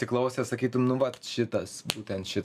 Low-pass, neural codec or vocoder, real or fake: 14.4 kHz; none; real